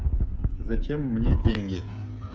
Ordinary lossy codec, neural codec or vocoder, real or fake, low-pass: none; codec, 16 kHz, 8 kbps, FreqCodec, smaller model; fake; none